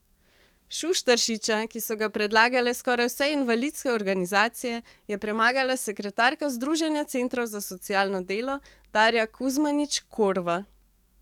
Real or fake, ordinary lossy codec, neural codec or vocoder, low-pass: fake; none; codec, 44.1 kHz, 7.8 kbps, DAC; 19.8 kHz